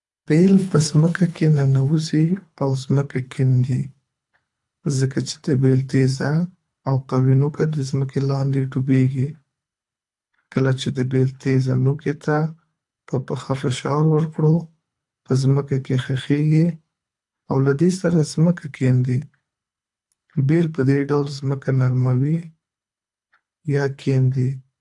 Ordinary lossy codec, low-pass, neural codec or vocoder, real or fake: AAC, 64 kbps; 10.8 kHz; codec, 24 kHz, 3 kbps, HILCodec; fake